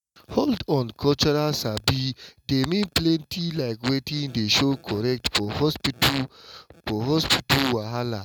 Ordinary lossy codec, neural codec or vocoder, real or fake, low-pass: none; none; real; 19.8 kHz